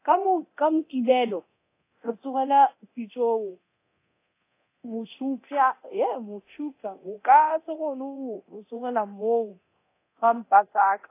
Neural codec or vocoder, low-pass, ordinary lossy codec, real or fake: codec, 24 kHz, 0.9 kbps, DualCodec; 3.6 kHz; AAC, 24 kbps; fake